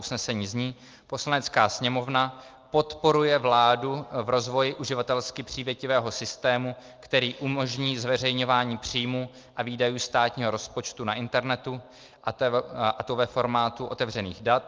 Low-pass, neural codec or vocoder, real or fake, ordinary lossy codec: 7.2 kHz; none; real; Opus, 24 kbps